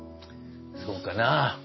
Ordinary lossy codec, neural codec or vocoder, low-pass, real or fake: MP3, 24 kbps; none; 7.2 kHz; real